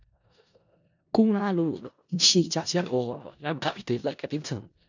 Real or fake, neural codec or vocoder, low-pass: fake; codec, 16 kHz in and 24 kHz out, 0.4 kbps, LongCat-Audio-Codec, four codebook decoder; 7.2 kHz